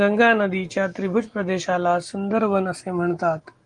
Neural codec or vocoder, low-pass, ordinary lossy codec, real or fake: none; 9.9 kHz; Opus, 24 kbps; real